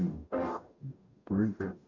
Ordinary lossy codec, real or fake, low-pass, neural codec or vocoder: none; fake; 7.2 kHz; codec, 44.1 kHz, 0.9 kbps, DAC